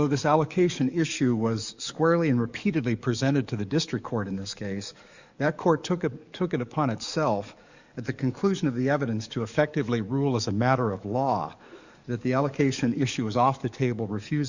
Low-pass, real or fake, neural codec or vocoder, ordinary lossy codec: 7.2 kHz; fake; codec, 44.1 kHz, 7.8 kbps, DAC; Opus, 64 kbps